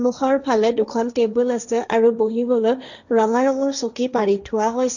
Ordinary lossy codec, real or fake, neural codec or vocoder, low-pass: none; fake; codec, 16 kHz, 1.1 kbps, Voila-Tokenizer; 7.2 kHz